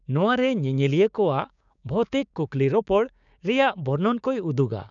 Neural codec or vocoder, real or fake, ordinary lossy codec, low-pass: codec, 16 kHz, 6 kbps, DAC; fake; none; 7.2 kHz